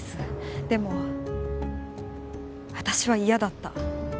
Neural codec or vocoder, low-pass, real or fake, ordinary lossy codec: none; none; real; none